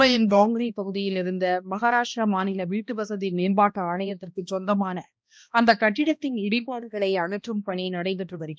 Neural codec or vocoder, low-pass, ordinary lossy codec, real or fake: codec, 16 kHz, 1 kbps, X-Codec, HuBERT features, trained on balanced general audio; none; none; fake